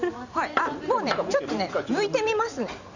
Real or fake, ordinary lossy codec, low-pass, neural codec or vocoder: fake; none; 7.2 kHz; vocoder, 44.1 kHz, 128 mel bands every 512 samples, BigVGAN v2